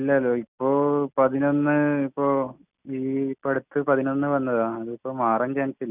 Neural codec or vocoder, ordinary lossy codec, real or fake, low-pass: none; none; real; 3.6 kHz